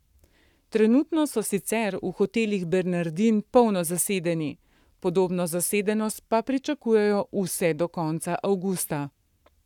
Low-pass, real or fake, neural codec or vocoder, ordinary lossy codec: 19.8 kHz; fake; codec, 44.1 kHz, 7.8 kbps, Pupu-Codec; none